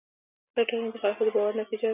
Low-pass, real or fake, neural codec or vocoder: 3.6 kHz; real; none